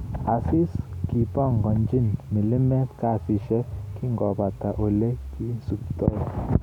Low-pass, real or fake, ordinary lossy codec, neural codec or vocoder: 19.8 kHz; real; none; none